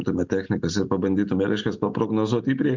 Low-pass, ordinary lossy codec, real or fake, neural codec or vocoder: 7.2 kHz; MP3, 64 kbps; real; none